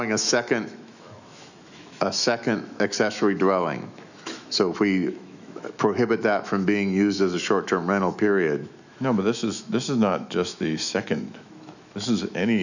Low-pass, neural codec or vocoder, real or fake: 7.2 kHz; autoencoder, 48 kHz, 128 numbers a frame, DAC-VAE, trained on Japanese speech; fake